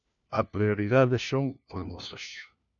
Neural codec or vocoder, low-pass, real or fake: codec, 16 kHz, 1 kbps, FunCodec, trained on LibriTTS, 50 frames a second; 7.2 kHz; fake